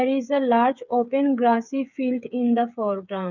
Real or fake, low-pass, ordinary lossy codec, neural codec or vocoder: fake; 7.2 kHz; none; codec, 16 kHz, 8 kbps, FreqCodec, smaller model